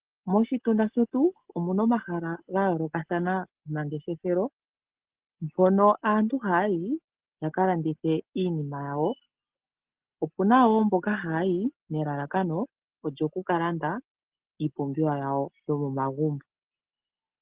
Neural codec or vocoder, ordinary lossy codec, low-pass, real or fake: none; Opus, 16 kbps; 3.6 kHz; real